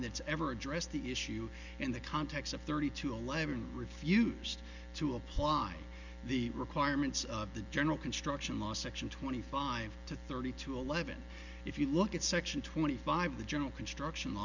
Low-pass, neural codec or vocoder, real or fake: 7.2 kHz; none; real